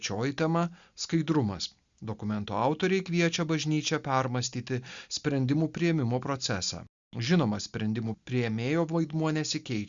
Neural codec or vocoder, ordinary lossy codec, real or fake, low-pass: none; Opus, 64 kbps; real; 7.2 kHz